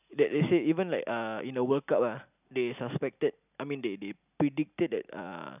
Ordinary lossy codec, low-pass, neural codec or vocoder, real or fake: none; 3.6 kHz; none; real